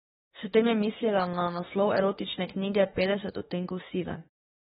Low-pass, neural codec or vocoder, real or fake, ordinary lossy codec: 19.8 kHz; autoencoder, 48 kHz, 32 numbers a frame, DAC-VAE, trained on Japanese speech; fake; AAC, 16 kbps